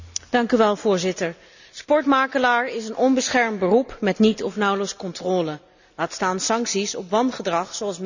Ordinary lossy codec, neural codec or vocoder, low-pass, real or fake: none; none; 7.2 kHz; real